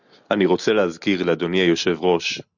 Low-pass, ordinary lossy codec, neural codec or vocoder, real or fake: 7.2 kHz; Opus, 64 kbps; none; real